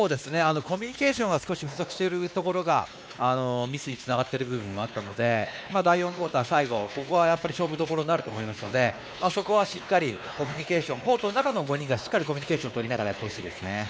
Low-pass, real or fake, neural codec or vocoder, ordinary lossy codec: none; fake; codec, 16 kHz, 2 kbps, X-Codec, WavLM features, trained on Multilingual LibriSpeech; none